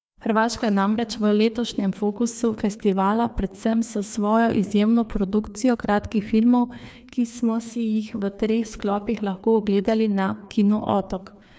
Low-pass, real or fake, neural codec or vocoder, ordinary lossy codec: none; fake; codec, 16 kHz, 2 kbps, FreqCodec, larger model; none